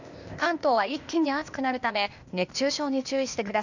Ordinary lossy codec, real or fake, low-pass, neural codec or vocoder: none; fake; 7.2 kHz; codec, 16 kHz, 0.8 kbps, ZipCodec